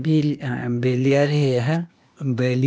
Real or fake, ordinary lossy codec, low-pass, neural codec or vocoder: fake; none; none; codec, 16 kHz, 0.8 kbps, ZipCodec